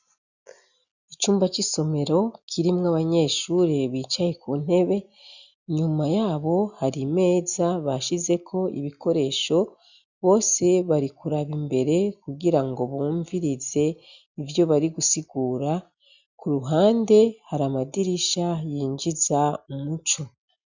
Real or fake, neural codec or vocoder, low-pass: real; none; 7.2 kHz